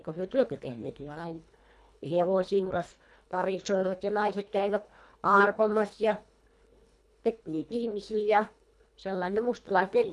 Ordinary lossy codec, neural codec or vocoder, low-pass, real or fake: none; codec, 24 kHz, 1.5 kbps, HILCodec; none; fake